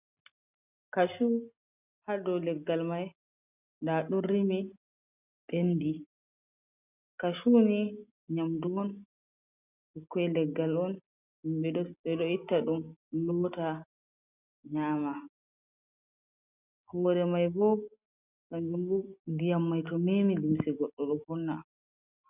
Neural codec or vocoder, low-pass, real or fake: none; 3.6 kHz; real